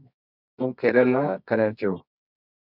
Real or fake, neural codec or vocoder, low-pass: fake; codec, 24 kHz, 0.9 kbps, WavTokenizer, medium music audio release; 5.4 kHz